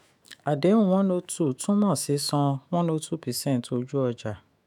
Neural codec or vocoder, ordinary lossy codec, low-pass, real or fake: autoencoder, 48 kHz, 128 numbers a frame, DAC-VAE, trained on Japanese speech; none; 19.8 kHz; fake